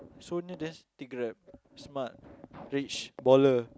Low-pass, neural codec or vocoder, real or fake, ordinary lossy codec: none; none; real; none